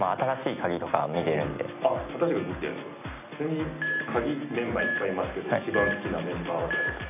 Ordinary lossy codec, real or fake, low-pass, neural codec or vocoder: none; real; 3.6 kHz; none